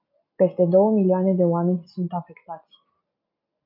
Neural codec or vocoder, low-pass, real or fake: none; 5.4 kHz; real